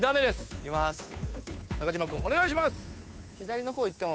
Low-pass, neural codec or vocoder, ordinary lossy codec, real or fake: none; codec, 16 kHz, 2 kbps, FunCodec, trained on Chinese and English, 25 frames a second; none; fake